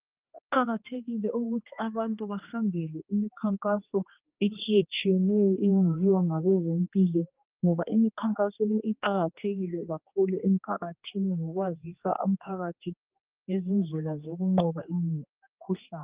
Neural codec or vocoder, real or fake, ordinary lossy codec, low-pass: codec, 16 kHz, 2 kbps, X-Codec, HuBERT features, trained on general audio; fake; Opus, 24 kbps; 3.6 kHz